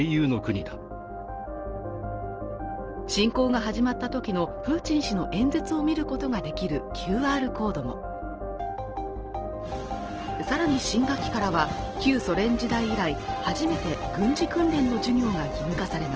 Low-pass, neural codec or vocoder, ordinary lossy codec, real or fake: 7.2 kHz; none; Opus, 16 kbps; real